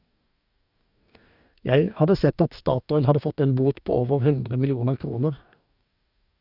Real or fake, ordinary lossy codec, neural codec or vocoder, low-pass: fake; none; codec, 44.1 kHz, 2.6 kbps, DAC; 5.4 kHz